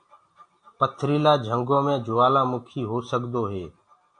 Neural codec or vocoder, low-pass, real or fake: none; 9.9 kHz; real